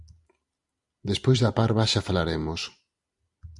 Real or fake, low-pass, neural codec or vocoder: real; 10.8 kHz; none